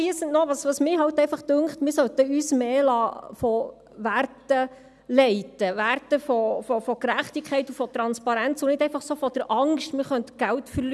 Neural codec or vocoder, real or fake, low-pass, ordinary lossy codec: none; real; none; none